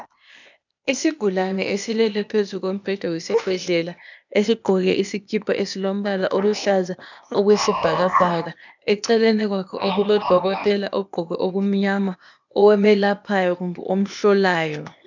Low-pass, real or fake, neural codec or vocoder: 7.2 kHz; fake; codec, 16 kHz, 0.8 kbps, ZipCodec